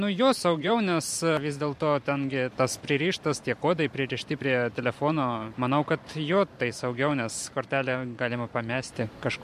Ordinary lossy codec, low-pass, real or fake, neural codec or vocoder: MP3, 64 kbps; 14.4 kHz; fake; vocoder, 44.1 kHz, 128 mel bands every 512 samples, BigVGAN v2